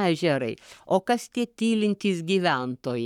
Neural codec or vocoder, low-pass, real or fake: codec, 44.1 kHz, 7.8 kbps, Pupu-Codec; 19.8 kHz; fake